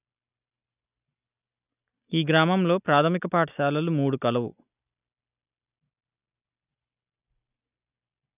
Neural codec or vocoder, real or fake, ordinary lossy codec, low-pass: none; real; none; 3.6 kHz